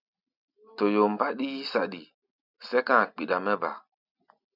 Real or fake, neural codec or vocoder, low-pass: real; none; 5.4 kHz